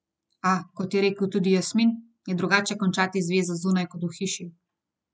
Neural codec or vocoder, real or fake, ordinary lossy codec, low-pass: none; real; none; none